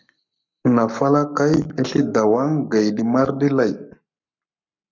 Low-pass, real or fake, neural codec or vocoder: 7.2 kHz; fake; codec, 44.1 kHz, 7.8 kbps, Pupu-Codec